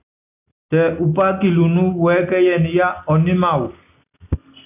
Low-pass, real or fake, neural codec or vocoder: 3.6 kHz; real; none